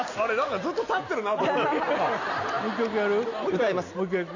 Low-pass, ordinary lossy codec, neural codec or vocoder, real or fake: 7.2 kHz; none; none; real